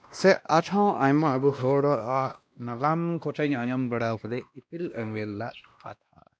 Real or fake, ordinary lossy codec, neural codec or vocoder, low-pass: fake; none; codec, 16 kHz, 1 kbps, X-Codec, WavLM features, trained on Multilingual LibriSpeech; none